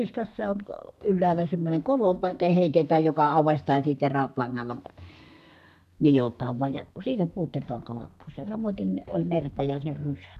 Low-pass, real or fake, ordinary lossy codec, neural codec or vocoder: 14.4 kHz; fake; none; codec, 32 kHz, 1.9 kbps, SNAC